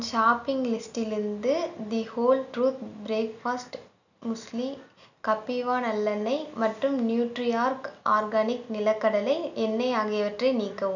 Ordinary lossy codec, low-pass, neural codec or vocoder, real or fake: none; 7.2 kHz; none; real